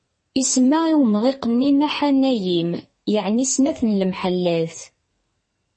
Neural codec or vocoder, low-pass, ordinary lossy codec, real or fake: codec, 44.1 kHz, 2.6 kbps, SNAC; 10.8 kHz; MP3, 32 kbps; fake